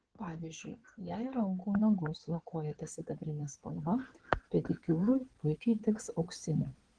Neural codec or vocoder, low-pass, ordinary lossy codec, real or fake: codec, 16 kHz in and 24 kHz out, 2.2 kbps, FireRedTTS-2 codec; 9.9 kHz; Opus, 16 kbps; fake